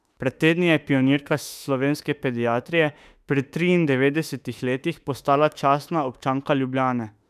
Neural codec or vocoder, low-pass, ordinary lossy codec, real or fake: autoencoder, 48 kHz, 32 numbers a frame, DAC-VAE, trained on Japanese speech; 14.4 kHz; none; fake